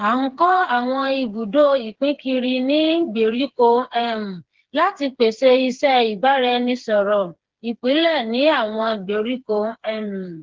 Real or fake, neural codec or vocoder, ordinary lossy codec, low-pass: fake; codec, 16 kHz, 4 kbps, FreqCodec, smaller model; Opus, 16 kbps; 7.2 kHz